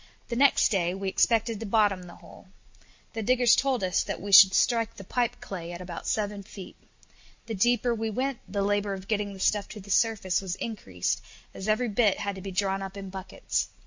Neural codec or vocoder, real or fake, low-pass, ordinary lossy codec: none; real; 7.2 kHz; MP3, 48 kbps